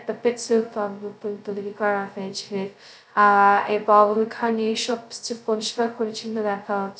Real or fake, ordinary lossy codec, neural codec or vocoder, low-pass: fake; none; codec, 16 kHz, 0.2 kbps, FocalCodec; none